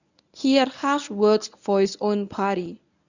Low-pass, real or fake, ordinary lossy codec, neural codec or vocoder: 7.2 kHz; fake; none; codec, 24 kHz, 0.9 kbps, WavTokenizer, medium speech release version 1